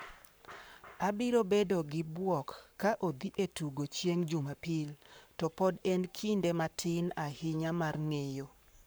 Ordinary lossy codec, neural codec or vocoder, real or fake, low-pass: none; codec, 44.1 kHz, 7.8 kbps, Pupu-Codec; fake; none